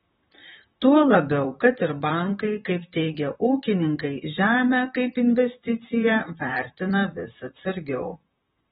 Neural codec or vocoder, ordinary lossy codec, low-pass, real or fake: vocoder, 44.1 kHz, 128 mel bands, Pupu-Vocoder; AAC, 16 kbps; 19.8 kHz; fake